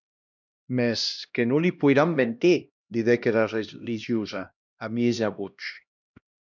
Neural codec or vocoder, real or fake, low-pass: codec, 16 kHz, 1 kbps, X-Codec, HuBERT features, trained on LibriSpeech; fake; 7.2 kHz